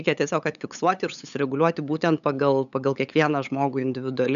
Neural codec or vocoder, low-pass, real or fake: codec, 16 kHz, 8 kbps, FunCodec, trained on LibriTTS, 25 frames a second; 7.2 kHz; fake